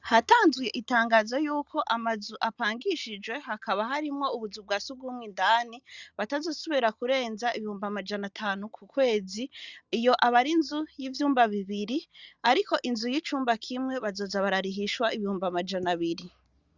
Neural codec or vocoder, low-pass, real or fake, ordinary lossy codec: none; 7.2 kHz; real; Opus, 64 kbps